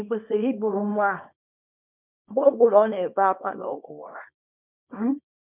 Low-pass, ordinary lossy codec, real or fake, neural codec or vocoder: 3.6 kHz; none; fake; codec, 24 kHz, 0.9 kbps, WavTokenizer, small release